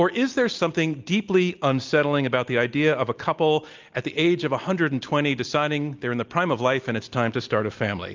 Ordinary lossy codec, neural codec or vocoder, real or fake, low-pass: Opus, 24 kbps; none; real; 7.2 kHz